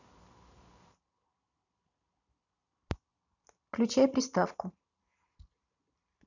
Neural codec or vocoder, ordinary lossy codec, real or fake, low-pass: vocoder, 44.1 kHz, 128 mel bands every 256 samples, BigVGAN v2; none; fake; 7.2 kHz